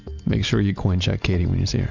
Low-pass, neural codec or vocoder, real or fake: 7.2 kHz; none; real